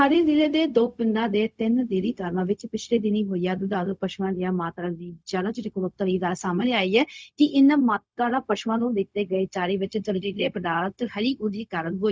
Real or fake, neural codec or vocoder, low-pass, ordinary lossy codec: fake; codec, 16 kHz, 0.4 kbps, LongCat-Audio-Codec; none; none